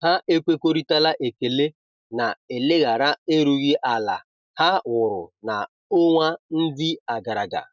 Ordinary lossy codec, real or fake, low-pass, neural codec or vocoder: none; real; 7.2 kHz; none